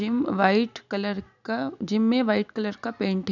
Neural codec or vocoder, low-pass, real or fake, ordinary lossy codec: none; 7.2 kHz; real; none